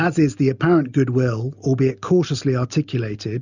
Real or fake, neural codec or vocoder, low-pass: real; none; 7.2 kHz